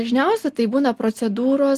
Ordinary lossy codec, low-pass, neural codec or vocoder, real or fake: Opus, 24 kbps; 14.4 kHz; none; real